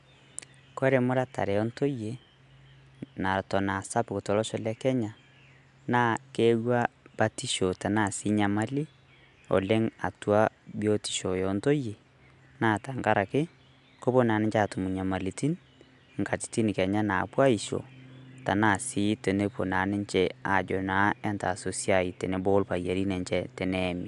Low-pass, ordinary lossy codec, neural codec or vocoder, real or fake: 10.8 kHz; AAC, 96 kbps; none; real